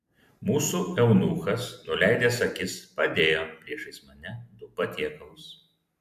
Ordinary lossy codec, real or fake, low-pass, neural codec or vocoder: AAC, 96 kbps; real; 14.4 kHz; none